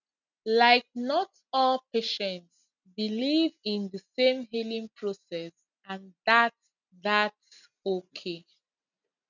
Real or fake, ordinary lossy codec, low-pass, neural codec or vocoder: real; none; 7.2 kHz; none